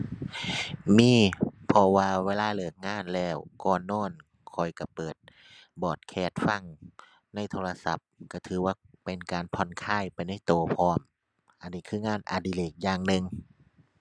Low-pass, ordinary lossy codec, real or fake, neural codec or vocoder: none; none; real; none